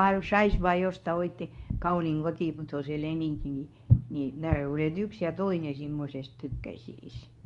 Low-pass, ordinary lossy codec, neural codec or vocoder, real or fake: 10.8 kHz; none; codec, 24 kHz, 0.9 kbps, WavTokenizer, medium speech release version 2; fake